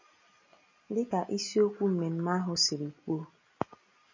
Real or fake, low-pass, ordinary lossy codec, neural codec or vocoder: real; 7.2 kHz; MP3, 32 kbps; none